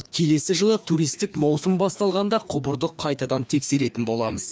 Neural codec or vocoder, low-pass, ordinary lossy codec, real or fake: codec, 16 kHz, 2 kbps, FreqCodec, larger model; none; none; fake